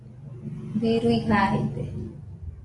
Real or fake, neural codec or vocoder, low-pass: real; none; 10.8 kHz